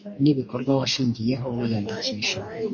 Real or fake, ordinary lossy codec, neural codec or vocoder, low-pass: fake; MP3, 32 kbps; codec, 44.1 kHz, 2.6 kbps, DAC; 7.2 kHz